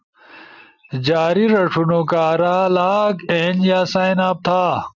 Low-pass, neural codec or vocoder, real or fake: 7.2 kHz; none; real